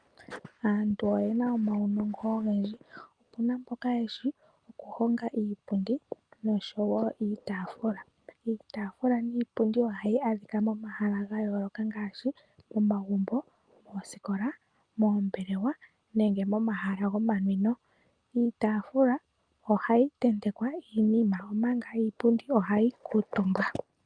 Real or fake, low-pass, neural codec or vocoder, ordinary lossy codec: real; 9.9 kHz; none; Opus, 32 kbps